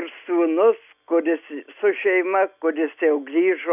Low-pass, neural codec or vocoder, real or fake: 3.6 kHz; none; real